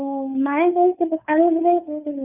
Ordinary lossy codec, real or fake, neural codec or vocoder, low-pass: none; fake; codec, 16 kHz, 2 kbps, FunCodec, trained on Chinese and English, 25 frames a second; 3.6 kHz